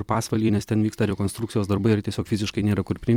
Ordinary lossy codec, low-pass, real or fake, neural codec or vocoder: MP3, 96 kbps; 19.8 kHz; fake; vocoder, 44.1 kHz, 128 mel bands every 256 samples, BigVGAN v2